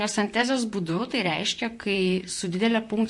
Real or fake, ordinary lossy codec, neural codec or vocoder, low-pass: fake; MP3, 48 kbps; vocoder, 44.1 kHz, 128 mel bands, Pupu-Vocoder; 10.8 kHz